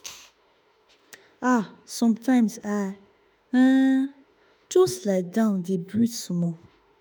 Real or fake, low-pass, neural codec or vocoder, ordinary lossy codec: fake; none; autoencoder, 48 kHz, 32 numbers a frame, DAC-VAE, trained on Japanese speech; none